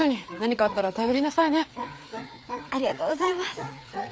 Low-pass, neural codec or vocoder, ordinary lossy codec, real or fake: none; codec, 16 kHz, 4 kbps, FreqCodec, larger model; none; fake